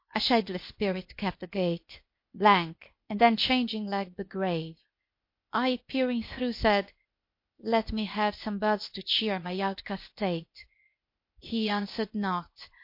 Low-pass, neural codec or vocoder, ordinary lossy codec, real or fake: 5.4 kHz; codec, 16 kHz, 0.8 kbps, ZipCodec; MP3, 32 kbps; fake